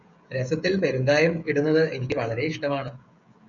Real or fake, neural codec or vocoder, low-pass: fake; codec, 16 kHz, 16 kbps, FreqCodec, smaller model; 7.2 kHz